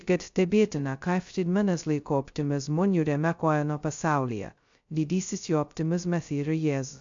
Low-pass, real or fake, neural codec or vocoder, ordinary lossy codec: 7.2 kHz; fake; codec, 16 kHz, 0.2 kbps, FocalCodec; AAC, 64 kbps